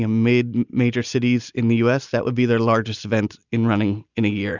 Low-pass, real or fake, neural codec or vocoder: 7.2 kHz; real; none